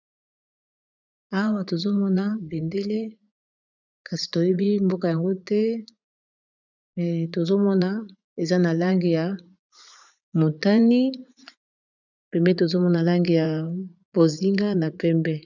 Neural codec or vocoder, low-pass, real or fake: vocoder, 44.1 kHz, 80 mel bands, Vocos; 7.2 kHz; fake